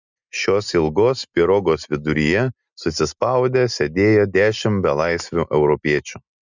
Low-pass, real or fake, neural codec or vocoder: 7.2 kHz; real; none